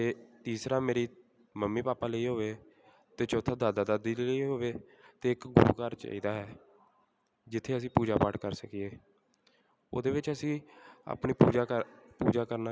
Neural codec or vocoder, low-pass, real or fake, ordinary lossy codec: none; none; real; none